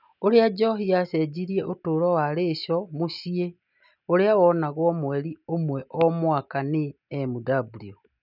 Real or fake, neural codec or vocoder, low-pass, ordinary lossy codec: real; none; 5.4 kHz; none